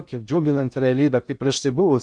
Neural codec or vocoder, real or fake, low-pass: codec, 16 kHz in and 24 kHz out, 0.6 kbps, FocalCodec, streaming, 4096 codes; fake; 9.9 kHz